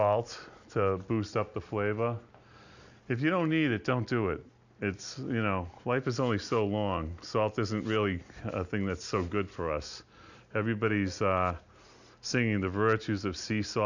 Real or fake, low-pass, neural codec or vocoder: real; 7.2 kHz; none